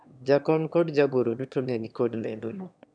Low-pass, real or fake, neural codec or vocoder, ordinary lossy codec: 9.9 kHz; fake; autoencoder, 22.05 kHz, a latent of 192 numbers a frame, VITS, trained on one speaker; none